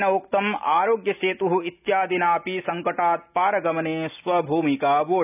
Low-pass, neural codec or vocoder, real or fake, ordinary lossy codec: 3.6 kHz; none; real; none